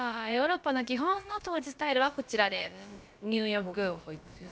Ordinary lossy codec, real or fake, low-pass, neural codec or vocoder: none; fake; none; codec, 16 kHz, about 1 kbps, DyCAST, with the encoder's durations